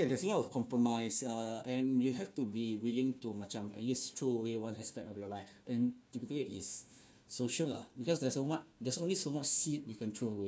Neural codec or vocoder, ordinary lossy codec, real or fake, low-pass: codec, 16 kHz, 1 kbps, FunCodec, trained on Chinese and English, 50 frames a second; none; fake; none